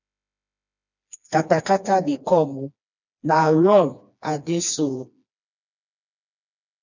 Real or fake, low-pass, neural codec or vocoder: fake; 7.2 kHz; codec, 16 kHz, 2 kbps, FreqCodec, smaller model